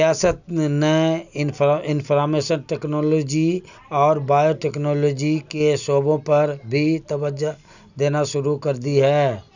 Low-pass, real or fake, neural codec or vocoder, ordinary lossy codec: 7.2 kHz; real; none; none